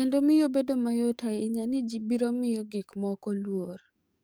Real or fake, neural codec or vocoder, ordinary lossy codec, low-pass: fake; codec, 44.1 kHz, 7.8 kbps, DAC; none; none